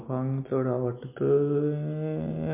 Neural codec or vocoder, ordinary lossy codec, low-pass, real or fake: none; MP3, 24 kbps; 3.6 kHz; real